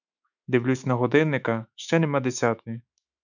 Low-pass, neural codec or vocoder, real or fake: 7.2 kHz; autoencoder, 48 kHz, 128 numbers a frame, DAC-VAE, trained on Japanese speech; fake